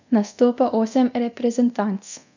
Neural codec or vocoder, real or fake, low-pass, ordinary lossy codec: codec, 24 kHz, 0.9 kbps, DualCodec; fake; 7.2 kHz; none